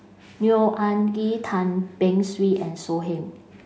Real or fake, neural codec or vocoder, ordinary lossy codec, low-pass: real; none; none; none